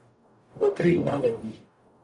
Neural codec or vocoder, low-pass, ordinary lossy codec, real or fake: codec, 44.1 kHz, 0.9 kbps, DAC; 10.8 kHz; MP3, 64 kbps; fake